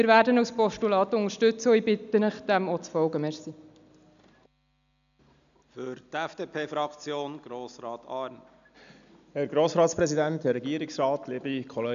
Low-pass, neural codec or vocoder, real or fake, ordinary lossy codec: 7.2 kHz; none; real; none